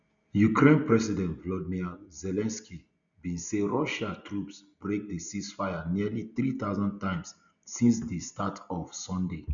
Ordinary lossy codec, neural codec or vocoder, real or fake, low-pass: none; none; real; 7.2 kHz